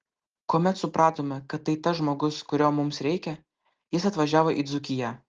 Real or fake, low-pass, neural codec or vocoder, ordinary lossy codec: real; 7.2 kHz; none; Opus, 16 kbps